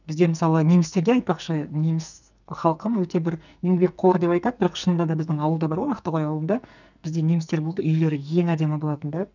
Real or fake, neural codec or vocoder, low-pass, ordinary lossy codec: fake; codec, 44.1 kHz, 2.6 kbps, SNAC; 7.2 kHz; none